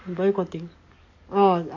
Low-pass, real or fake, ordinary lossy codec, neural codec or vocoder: 7.2 kHz; fake; AAC, 48 kbps; codec, 44.1 kHz, 7.8 kbps, DAC